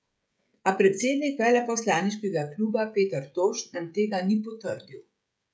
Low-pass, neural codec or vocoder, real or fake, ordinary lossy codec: none; codec, 16 kHz, 16 kbps, FreqCodec, smaller model; fake; none